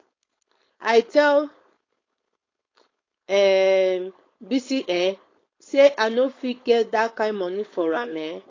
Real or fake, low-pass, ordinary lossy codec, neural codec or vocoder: fake; 7.2 kHz; AAC, 32 kbps; codec, 16 kHz, 4.8 kbps, FACodec